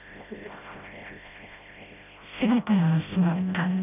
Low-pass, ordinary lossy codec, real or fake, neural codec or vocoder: 3.6 kHz; none; fake; codec, 16 kHz, 0.5 kbps, FreqCodec, smaller model